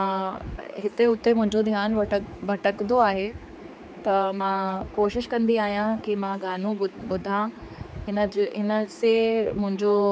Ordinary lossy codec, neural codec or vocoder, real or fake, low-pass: none; codec, 16 kHz, 4 kbps, X-Codec, HuBERT features, trained on general audio; fake; none